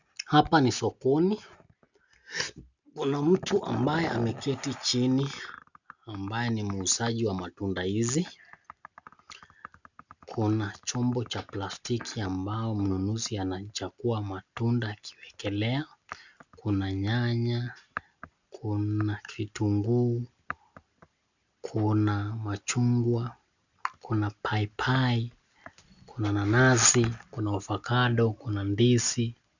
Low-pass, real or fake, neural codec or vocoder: 7.2 kHz; real; none